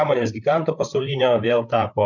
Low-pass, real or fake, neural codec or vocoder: 7.2 kHz; fake; codec, 16 kHz, 8 kbps, FreqCodec, larger model